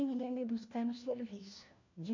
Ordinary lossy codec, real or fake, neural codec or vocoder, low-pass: none; fake; codec, 16 kHz, 1 kbps, FunCodec, trained on LibriTTS, 50 frames a second; 7.2 kHz